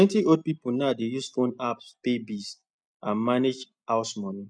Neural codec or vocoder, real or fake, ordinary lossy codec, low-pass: none; real; none; 9.9 kHz